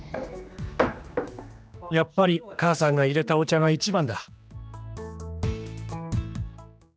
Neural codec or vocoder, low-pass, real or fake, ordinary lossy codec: codec, 16 kHz, 2 kbps, X-Codec, HuBERT features, trained on general audio; none; fake; none